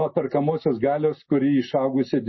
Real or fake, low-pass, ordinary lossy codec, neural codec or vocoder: real; 7.2 kHz; MP3, 24 kbps; none